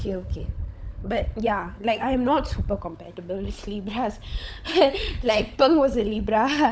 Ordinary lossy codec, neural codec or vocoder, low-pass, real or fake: none; codec, 16 kHz, 16 kbps, FunCodec, trained on LibriTTS, 50 frames a second; none; fake